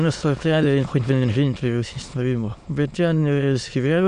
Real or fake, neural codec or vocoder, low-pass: fake; autoencoder, 22.05 kHz, a latent of 192 numbers a frame, VITS, trained on many speakers; 9.9 kHz